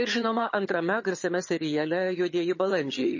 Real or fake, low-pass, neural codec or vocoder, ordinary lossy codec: fake; 7.2 kHz; vocoder, 22.05 kHz, 80 mel bands, HiFi-GAN; MP3, 32 kbps